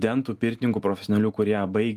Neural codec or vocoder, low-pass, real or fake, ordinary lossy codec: none; 14.4 kHz; real; Opus, 32 kbps